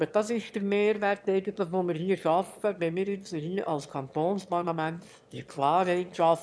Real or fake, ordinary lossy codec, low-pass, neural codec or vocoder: fake; none; none; autoencoder, 22.05 kHz, a latent of 192 numbers a frame, VITS, trained on one speaker